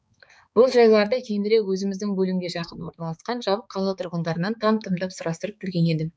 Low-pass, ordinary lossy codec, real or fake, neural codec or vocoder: none; none; fake; codec, 16 kHz, 4 kbps, X-Codec, HuBERT features, trained on balanced general audio